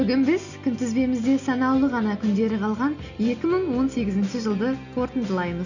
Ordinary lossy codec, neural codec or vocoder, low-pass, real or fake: none; none; 7.2 kHz; real